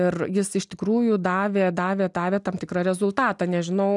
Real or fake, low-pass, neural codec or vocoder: real; 10.8 kHz; none